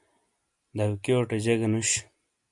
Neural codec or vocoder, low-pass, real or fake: none; 10.8 kHz; real